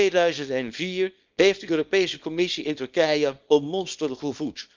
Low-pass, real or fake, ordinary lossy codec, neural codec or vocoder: 7.2 kHz; fake; Opus, 24 kbps; codec, 24 kHz, 0.9 kbps, WavTokenizer, small release